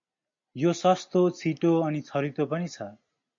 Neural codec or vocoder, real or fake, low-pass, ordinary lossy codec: none; real; 7.2 kHz; MP3, 48 kbps